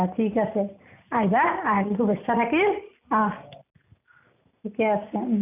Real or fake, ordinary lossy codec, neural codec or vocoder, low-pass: real; none; none; 3.6 kHz